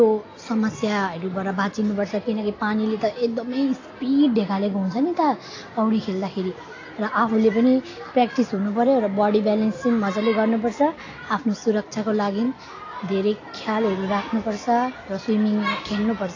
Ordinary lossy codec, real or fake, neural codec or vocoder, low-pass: AAC, 32 kbps; real; none; 7.2 kHz